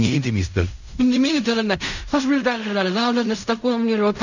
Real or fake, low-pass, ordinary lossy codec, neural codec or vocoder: fake; 7.2 kHz; none; codec, 16 kHz in and 24 kHz out, 0.4 kbps, LongCat-Audio-Codec, fine tuned four codebook decoder